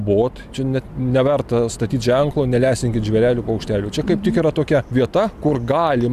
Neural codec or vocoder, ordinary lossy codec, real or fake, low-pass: none; Opus, 64 kbps; real; 14.4 kHz